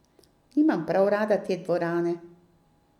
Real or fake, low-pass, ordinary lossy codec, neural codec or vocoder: real; 19.8 kHz; none; none